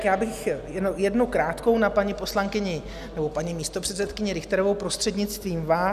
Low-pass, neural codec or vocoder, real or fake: 14.4 kHz; none; real